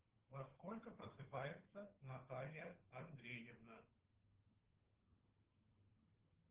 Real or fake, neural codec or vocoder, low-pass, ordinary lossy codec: fake; codec, 16 kHz, 4 kbps, FunCodec, trained on Chinese and English, 50 frames a second; 3.6 kHz; Opus, 16 kbps